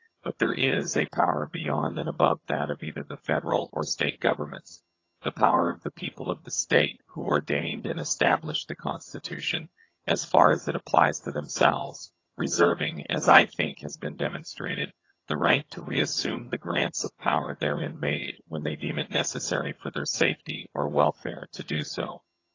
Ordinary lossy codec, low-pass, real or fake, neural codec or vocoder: AAC, 32 kbps; 7.2 kHz; fake; vocoder, 22.05 kHz, 80 mel bands, HiFi-GAN